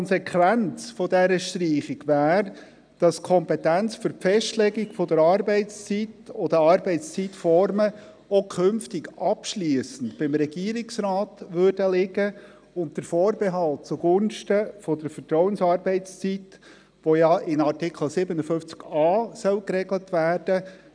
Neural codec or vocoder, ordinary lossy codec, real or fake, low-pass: none; none; real; 9.9 kHz